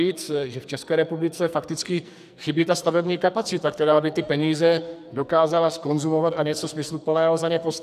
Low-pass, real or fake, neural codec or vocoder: 14.4 kHz; fake; codec, 44.1 kHz, 2.6 kbps, SNAC